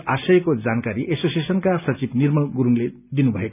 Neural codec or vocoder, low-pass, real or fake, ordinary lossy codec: none; 3.6 kHz; real; none